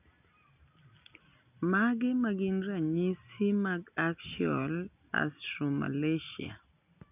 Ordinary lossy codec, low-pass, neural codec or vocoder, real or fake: none; 3.6 kHz; none; real